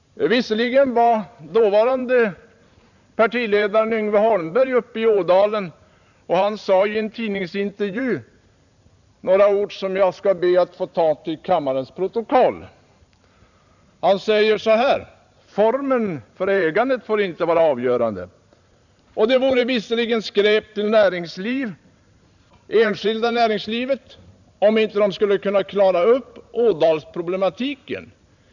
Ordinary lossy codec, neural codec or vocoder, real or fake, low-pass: none; vocoder, 44.1 kHz, 128 mel bands every 512 samples, BigVGAN v2; fake; 7.2 kHz